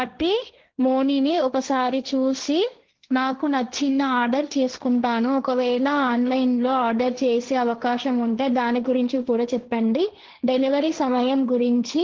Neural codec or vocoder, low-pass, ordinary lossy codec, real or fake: codec, 16 kHz, 1.1 kbps, Voila-Tokenizer; 7.2 kHz; Opus, 16 kbps; fake